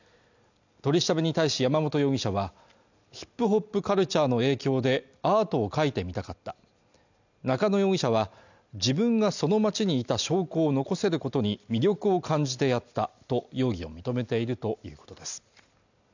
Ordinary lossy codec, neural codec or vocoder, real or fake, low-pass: none; none; real; 7.2 kHz